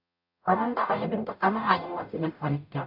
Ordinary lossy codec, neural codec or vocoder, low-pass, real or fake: AAC, 48 kbps; codec, 44.1 kHz, 0.9 kbps, DAC; 5.4 kHz; fake